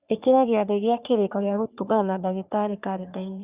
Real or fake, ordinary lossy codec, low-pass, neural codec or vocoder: fake; Opus, 64 kbps; 3.6 kHz; codec, 44.1 kHz, 3.4 kbps, Pupu-Codec